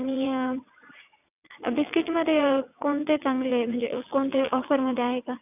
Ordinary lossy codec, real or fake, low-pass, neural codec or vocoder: none; fake; 3.6 kHz; vocoder, 22.05 kHz, 80 mel bands, WaveNeXt